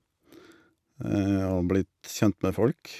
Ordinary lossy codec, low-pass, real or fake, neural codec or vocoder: none; 14.4 kHz; real; none